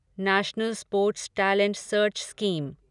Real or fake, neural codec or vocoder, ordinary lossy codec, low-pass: real; none; none; 10.8 kHz